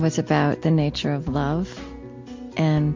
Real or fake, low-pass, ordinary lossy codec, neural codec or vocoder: real; 7.2 kHz; MP3, 48 kbps; none